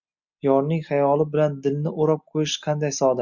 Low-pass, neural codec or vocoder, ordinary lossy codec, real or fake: 7.2 kHz; none; MP3, 64 kbps; real